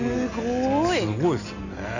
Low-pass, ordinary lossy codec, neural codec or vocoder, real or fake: 7.2 kHz; none; none; real